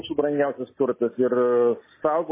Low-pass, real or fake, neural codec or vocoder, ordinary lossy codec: 3.6 kHz; real; none; MP3, 16 kbps